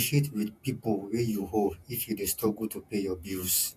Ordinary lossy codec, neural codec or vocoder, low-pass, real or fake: MP3, 96 kbps; vocoder, 48 kHz, 128 mel bands, Vocos; 14.4 kHz; fake